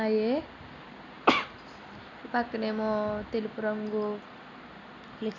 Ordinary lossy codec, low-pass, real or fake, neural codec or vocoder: none; 7.2 kHz; real; none